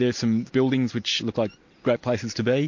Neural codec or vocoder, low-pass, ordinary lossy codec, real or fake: none; 7.2 kHz; MP3, 48 kbps; real